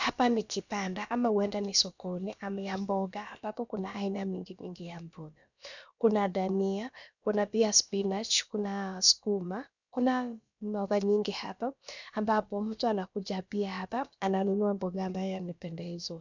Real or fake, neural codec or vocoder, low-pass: fake; codec, 16 kHz, about 1 kbps, DyCAST, with the encoder's durations; 7.2 kHz